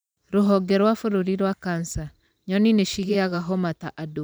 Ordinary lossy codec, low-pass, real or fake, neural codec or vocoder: none; none; fake; vocoder, 44.1 kHz, 128 mel bands every 512 samples, BigVGAN v2